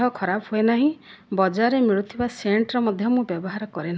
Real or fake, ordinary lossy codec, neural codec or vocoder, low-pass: real; none; none; none